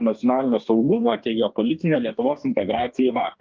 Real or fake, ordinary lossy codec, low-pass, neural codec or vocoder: fake; Opus, 24 kbps; 7.2 kHz; codec, 44.1 kHz, 2.6 kbps, DAC